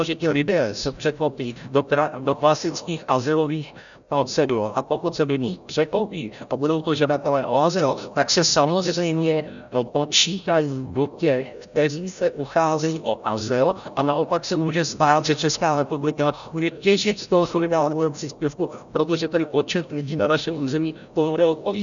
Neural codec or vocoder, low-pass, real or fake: codec, 16 kHz, 0.5 kbps, FreqCodec, larger model; 7.2 kHz; fake